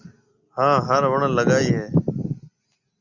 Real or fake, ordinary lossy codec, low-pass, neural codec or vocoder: real; Opus, 64 kbps; 7.2 kHz; none